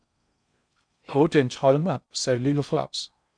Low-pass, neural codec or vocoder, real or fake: 9.9 kHz; codec, 16 kHz in and 24 kHz out, 0.6 kbps, FocalCodec, streaming, 4096 codes; fake